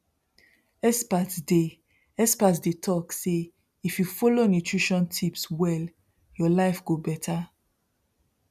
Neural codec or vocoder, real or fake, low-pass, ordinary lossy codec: none; real; 14.4 kHz; none